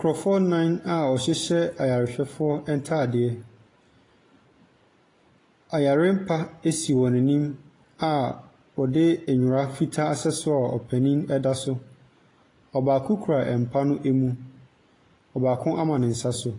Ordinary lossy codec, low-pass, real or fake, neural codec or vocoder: AAC, 32 kbps; 10.8 kHz; real; none